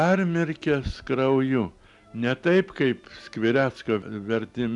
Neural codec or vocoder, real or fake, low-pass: none; real; 10.8 kHz